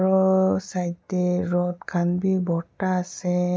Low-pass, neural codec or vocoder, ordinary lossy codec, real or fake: none; none; none; real